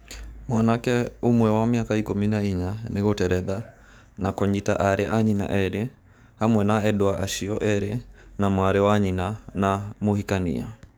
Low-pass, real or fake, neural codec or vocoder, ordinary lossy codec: none; fake; codec, 44.1 kHz, 7.8 kbps, DAC; none